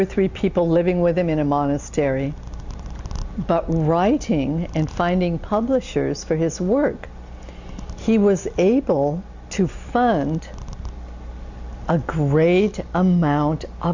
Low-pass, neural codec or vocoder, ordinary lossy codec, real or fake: 7.2 kHz; none; Opus, 64 kbps; real